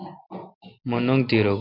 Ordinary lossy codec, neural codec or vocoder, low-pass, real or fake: MP3, 48 kbps; none; 5.4 kHz; real